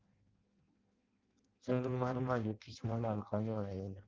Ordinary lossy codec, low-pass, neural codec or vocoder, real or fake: Opus, 32 kbps; 7.2 kHz; codec, 16 kHz in and 24 kHz out, 0.6 kbps, FireRedTTS-2 codec; fake